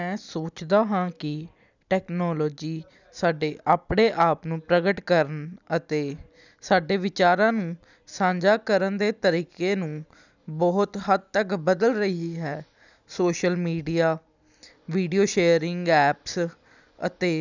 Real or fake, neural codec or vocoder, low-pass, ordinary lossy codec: real; none; 7.2 kHz; none